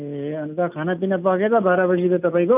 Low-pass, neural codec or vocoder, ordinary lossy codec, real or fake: 3.6 kHz; none; none; real